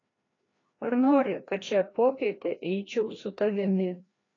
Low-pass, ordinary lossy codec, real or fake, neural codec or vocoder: 7.2 kHz; AAC, 32 kbps; fake; codec, 16 kHz, 1 kbps, FreqCodec, larger model